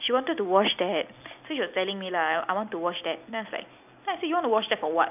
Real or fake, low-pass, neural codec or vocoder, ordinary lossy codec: real; 3.6 kHz; none; none